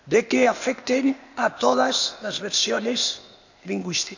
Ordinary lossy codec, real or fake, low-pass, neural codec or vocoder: none; fake; 7.2 kHz; codec, 16 kHz, 0.8 kbps, ZipCodec